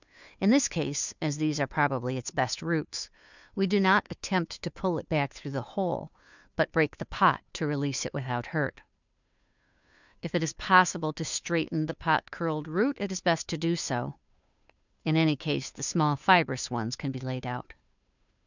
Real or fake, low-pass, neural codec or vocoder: fake; 7.2 kHz; codec, 16 kHz, 2 kbps, FunCodec, trained on Chinese and English, 25 frames a second